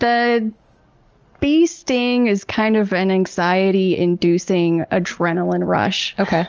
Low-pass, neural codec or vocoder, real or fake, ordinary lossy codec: 7.2 kHz; none; real; Opus, 24 kbps